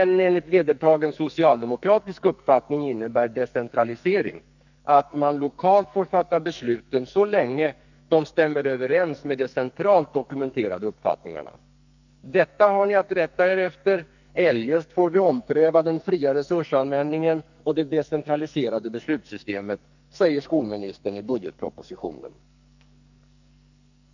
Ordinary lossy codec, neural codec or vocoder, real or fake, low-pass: none; codec, 44.1 kHz, 2.6 kbps, SNAC; fake; 7.2 kHz